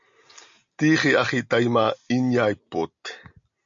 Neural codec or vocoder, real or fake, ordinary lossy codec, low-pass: none; real; MP3, 64 kbps; 7.2 kHz